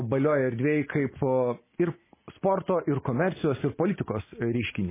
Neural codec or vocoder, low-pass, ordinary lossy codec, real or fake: none; 3.6 kHz; MP3, 16 kbps; real